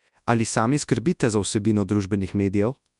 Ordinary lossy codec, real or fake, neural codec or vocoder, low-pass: none; fake; codec, 24 kHz, 0.9 kbps, WavTokenizer, large speech release; 10.8 kHz